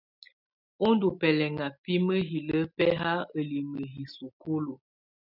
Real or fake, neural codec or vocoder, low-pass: real; none; 5.4 kHz